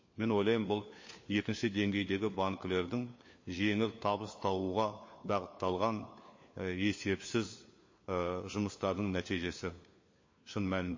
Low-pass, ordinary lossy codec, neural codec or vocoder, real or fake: 7.2 kHz; MP3, 32 kbps; codec, 16 kHz, 4 kbps, FunCodec, trained on LibriTTS, 50 frames a second; fake